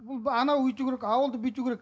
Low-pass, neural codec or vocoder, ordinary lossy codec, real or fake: none; none; none; real